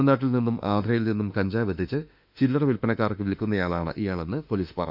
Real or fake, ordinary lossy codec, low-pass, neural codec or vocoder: fake; none; 5.4 kHz; autoencoder, 48 kHz, 32 numbers a frame, DAC-VAE, trained on Japanese speech